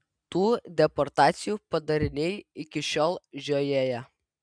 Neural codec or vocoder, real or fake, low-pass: none; real; 9.9 kHz